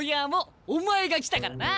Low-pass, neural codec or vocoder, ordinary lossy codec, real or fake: none; none; none; real